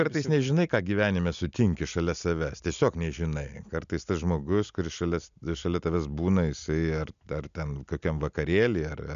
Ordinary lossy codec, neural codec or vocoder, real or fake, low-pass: MP3, 96 kbps; none; real; 7.2 kHz